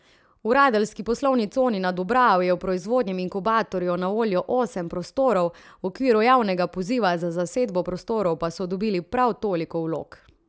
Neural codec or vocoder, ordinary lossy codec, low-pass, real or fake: none; none; none; real